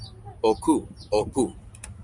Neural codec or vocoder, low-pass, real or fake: none; 10.8 kHz; real